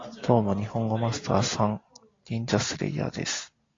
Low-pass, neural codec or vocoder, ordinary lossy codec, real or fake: 7.2 kHz; none; AAC, 32 kbps; real